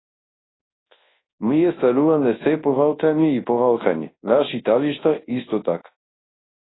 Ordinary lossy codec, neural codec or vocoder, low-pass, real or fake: AAC, 16 kbps; codec, 24 kHz, 0.9 kbps, WavTokenizer, large speech release; 7.2 kHz; fake